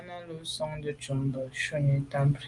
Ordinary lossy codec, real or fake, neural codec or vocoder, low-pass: Opus, 32 kbps; real; none; 10.8 kHz